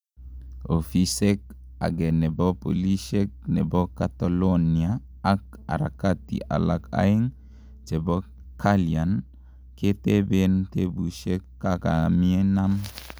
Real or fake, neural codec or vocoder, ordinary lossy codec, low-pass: real; none; none; none